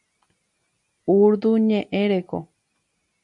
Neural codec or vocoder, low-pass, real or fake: none; 10.8 kHz; real